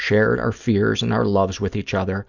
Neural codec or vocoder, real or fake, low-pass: none; real; 7.2 kHz